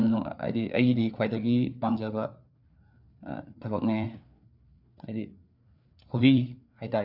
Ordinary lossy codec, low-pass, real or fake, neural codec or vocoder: none; 5.4 kHz; fake; codec, 16 kHz, 4 kbps, FreqCodec, larger model